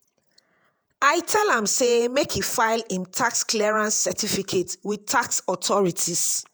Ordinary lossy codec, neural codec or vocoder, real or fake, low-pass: none; vocoder, 48 kHz, 128 mel bands, Vocos; fake; none